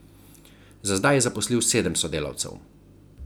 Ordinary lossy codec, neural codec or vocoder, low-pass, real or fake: none; none; none; real